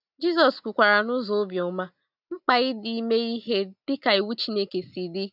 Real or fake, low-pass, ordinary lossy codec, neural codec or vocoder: real; 5.4 kHz; none; none